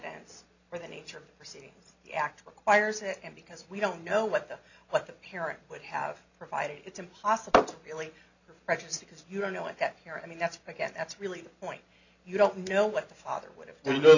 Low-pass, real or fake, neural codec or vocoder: 7.2 kHz; real; none